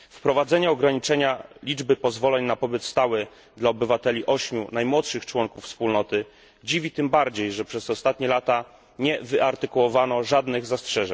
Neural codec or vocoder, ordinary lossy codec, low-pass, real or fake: none; none; none; real